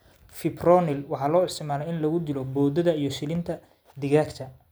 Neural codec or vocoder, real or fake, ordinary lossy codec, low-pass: none; real; none; none